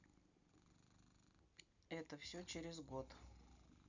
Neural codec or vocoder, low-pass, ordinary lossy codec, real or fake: none; 7.2 kHz; none; real